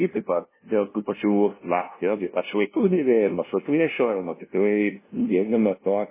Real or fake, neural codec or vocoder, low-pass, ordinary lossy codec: fake; codec, 16 kHz, 0.5 kbps, FunCodec, trained on LibriTTS, 25 frames a second; 3.6 kHz; MP3, 16 kbps